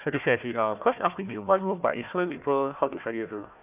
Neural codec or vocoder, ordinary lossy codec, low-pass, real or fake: codec, 16 kHz, 1 kbps, FunCodec, trained on Chinese and English, 50 frames a second; none; 3.6 kHz; fake